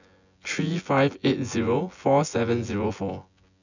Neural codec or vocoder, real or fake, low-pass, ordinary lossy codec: vocoder, 24 kHz, 100 mel bands, Vocos; fake; 7.2 kHz; none